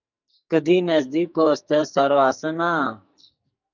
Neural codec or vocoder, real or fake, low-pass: codec, 44.1 kHz, 2.6 kbps, SNAC; fake; 7.2 kHz